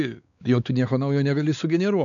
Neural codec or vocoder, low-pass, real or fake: codec, 16 kHz, 4 kbps, X-Codec, WavLM features, trained on Multilingual LibriSpeech; 7.2 kHz; fake